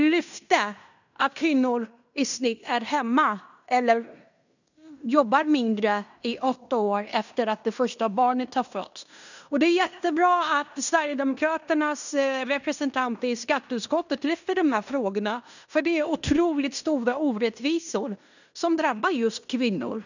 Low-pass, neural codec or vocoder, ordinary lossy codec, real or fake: 7.2 kHz; codec, 16 kHz in and 24 kHz out, 0.9 kbps, LongCat-Audio-Codec, fine tuned four codebook decoder; none; fake